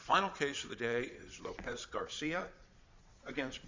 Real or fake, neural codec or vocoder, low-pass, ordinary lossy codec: fake; vocoder, 22.05 kHz, 80 mel bands, Vocos; 7.2 kHz; MP3, 64 kbps